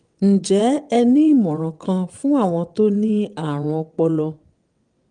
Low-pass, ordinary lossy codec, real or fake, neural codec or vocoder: 9.9 kHz; Opus, 32 kbps; fake; vocoder, 22.05 kHz, 80 mel bands, WaveNeXt